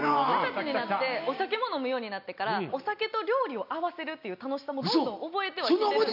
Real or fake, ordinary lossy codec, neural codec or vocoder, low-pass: real; none; none; 5.4 kHz